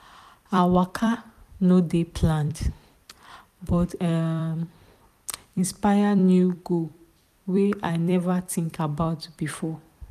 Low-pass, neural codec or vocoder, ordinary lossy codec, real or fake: 14.4 kHz; vocoder, 44.1 kHz, 128 mel bands every 256 samples, BigVGAN v2; none; fake